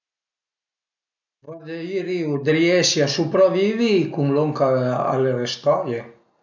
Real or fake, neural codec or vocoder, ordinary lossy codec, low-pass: real; none; none; 7.2 kHz